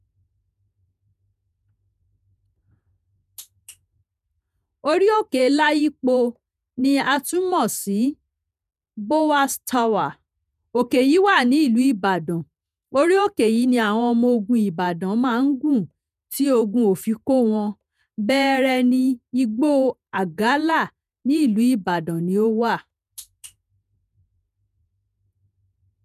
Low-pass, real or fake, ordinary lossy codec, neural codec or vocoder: 14.4 kHz; fake; none; vocoder, 48 kHz, 128 mel bands, Vocos